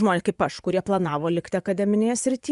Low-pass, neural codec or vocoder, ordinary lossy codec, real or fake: 10.8 kHz; vocoder, 24 kHz, 100 mel bands, Vocos; Opus, 64 kbps; fake